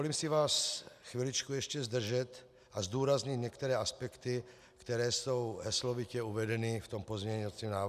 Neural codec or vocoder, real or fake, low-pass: none; real; 14.4 kHz